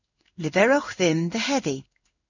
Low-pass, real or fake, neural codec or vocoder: 7.2 kHz; fake; codec, 16 kHz in and 24 kHz out, 1 kbps, XY-Tokenizer